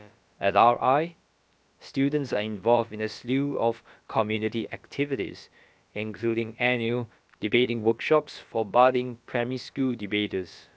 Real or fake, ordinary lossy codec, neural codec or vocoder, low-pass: fake; none; codec, 16 kHz, about 1 kbps, DyCAST, with the encoder's durations; none